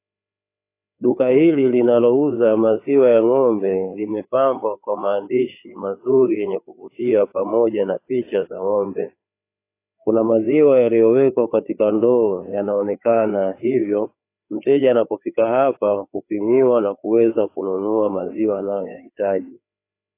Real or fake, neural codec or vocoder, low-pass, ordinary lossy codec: fake; codec, 16 kHz, 4 kbps, FreqCodec, larger model; 3.6 kHz; AAC, 24 kbps